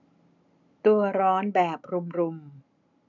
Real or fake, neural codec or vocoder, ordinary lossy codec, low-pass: real; none; none; 7.2 kHz